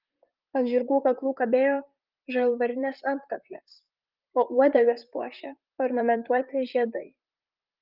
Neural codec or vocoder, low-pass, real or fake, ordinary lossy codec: vocoder, 44.1 kHz, 128 mel bands, Pupu-Vocoder; 5.4 kHz; fake; Opus, 32 kbps